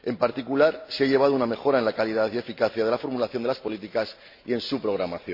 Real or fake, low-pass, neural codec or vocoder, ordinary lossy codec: real; 5.4 kHz; none; MP3, 32 kbps